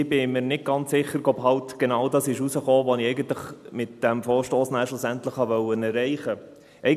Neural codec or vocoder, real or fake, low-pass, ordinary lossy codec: none; real; 14.4 kHz; none